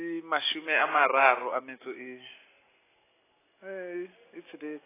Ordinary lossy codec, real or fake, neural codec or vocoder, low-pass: AAC, 16 kbps; real; none; 3.6 kHz